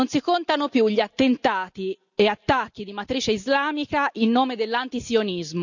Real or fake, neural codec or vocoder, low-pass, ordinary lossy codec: real; none; 7.2 kHz; none